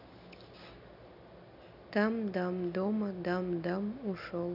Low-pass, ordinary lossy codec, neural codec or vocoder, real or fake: 5.4 kHz; none; none; real